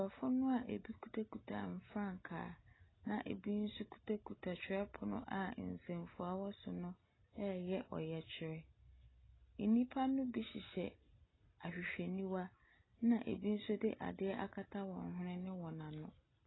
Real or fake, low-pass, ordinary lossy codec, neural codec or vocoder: real; 7.2 kHz; AAC, 16 kbps; none